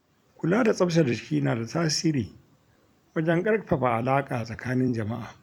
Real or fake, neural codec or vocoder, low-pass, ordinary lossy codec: fake; vocoder, 44.1 kHz, 128 mel bands every 256 samples, BigVGAN v2; 19.8 kHz; none